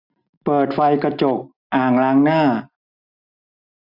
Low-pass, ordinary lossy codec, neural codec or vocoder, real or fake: 5.4 kHz; none; none; real